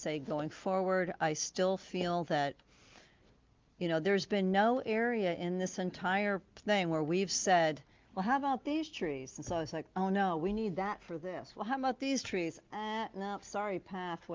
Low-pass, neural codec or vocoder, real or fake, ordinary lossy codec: 7.2 kHz; none; real; Opus, 32 kbps